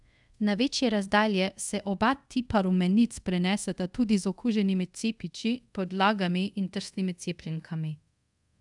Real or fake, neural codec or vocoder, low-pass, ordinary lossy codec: fake; codec, 24 kHz, 0.5 kbps, DualCodec; 10.8 kHz; none